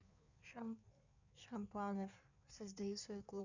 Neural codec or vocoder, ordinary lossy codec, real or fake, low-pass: codec, 16 kHz in and 24 kHz out, 1.1 kbps, FireRedTTS-2 codec; none; fake; 7.2 kHz